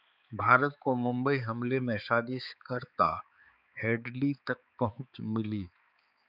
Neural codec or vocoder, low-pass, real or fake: codec, 16 kHz, 4 kbps, X-Codec, HuBERT features, trained on balanced general audio; 5.4 kHz; fake